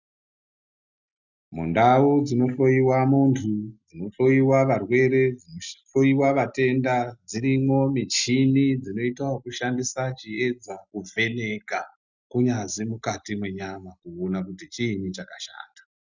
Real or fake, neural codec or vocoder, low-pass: real; none; 7.2 kHz